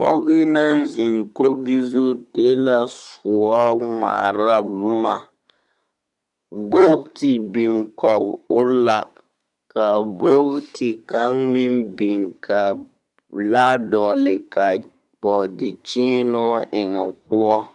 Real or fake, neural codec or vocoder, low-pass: fake; codec, 24 kHz, 1 kbps, SNAC; 10.8 kHz